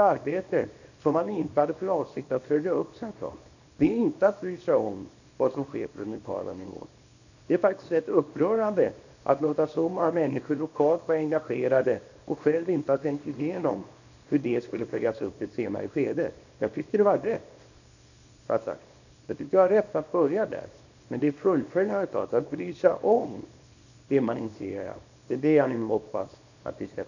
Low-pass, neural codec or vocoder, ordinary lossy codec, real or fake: 7.2 kHz; codec, 24 kHz, 0.9 kbps, WavTokenizer, small release; none; fake